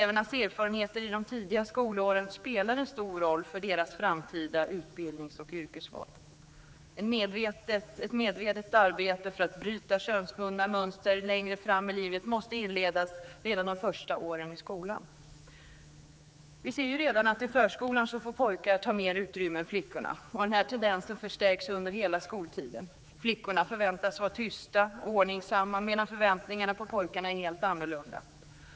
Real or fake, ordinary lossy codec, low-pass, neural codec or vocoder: fake; none; none; codec, 16 kHz, 4 kbps, X-Codec, HuBERT features, trained on general audio